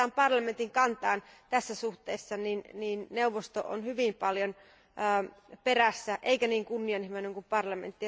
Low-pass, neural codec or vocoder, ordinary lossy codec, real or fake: none; none; none; real